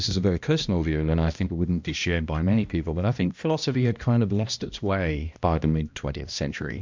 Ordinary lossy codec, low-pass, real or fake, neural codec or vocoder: MP3, 64 kbps; 7.2 kHz; fake; codec, 16 kHz, 1 kbps, X-Codec, HuBERT features, trained on balanced general audio